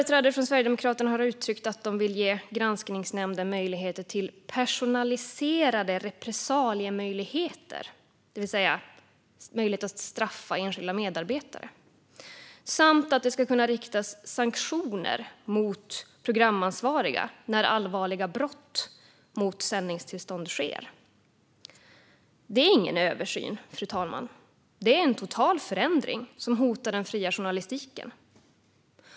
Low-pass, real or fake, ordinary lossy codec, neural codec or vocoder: none; real; none; none